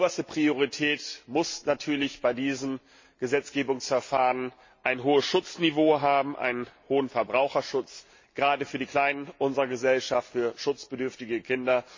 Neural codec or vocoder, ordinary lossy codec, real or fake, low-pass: none; MP3, 32 kbps; real; 7.2 kHz